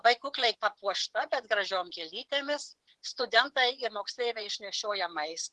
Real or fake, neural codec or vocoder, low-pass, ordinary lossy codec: fake; vocoder, 24 kHz, 100 mel bands, Vocos; 10.8 kHz; Opus, 16 kbps